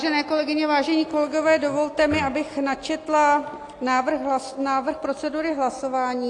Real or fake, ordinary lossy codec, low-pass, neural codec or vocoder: real; AAC, 48 kbps; 10.8 kHz; none